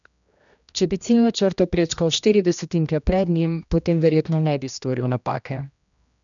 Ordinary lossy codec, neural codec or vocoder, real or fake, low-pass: none; codec, 16 kHz, 1 kbps, X-Codec, HuBERT features, trained on general audio; fake; 7.2 kHz